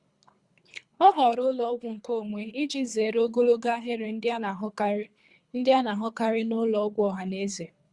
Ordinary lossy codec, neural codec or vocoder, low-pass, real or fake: Opus, 64 kbps; codec, 24 kHz, 3 kbps, HILCodec; 10.8 kHz; fake